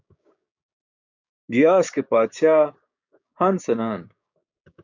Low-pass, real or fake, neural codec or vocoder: 7.2 kHz; fake; codec, 16 kHz, 6 kbps, DAC